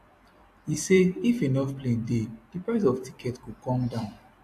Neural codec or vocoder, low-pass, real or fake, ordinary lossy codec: vocoder, 44.1 kHz, 128 mel bands every 256 samples, BigVGAN v2; 14.4 kHz; fake; AAC, 64 kbps